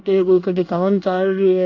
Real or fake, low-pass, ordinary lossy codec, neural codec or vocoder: fake; 7.2 kHz; none; codec, 24 kHz, 1 kbps, SNAC